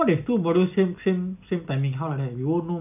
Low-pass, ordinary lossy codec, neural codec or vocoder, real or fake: 3.6 kHz; none; none; real